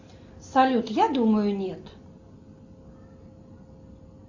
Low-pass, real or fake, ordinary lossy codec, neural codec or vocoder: 7.2 kHz; real; AAC, 48 kbps; none